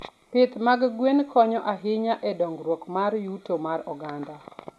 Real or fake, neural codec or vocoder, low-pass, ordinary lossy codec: real; none; none; none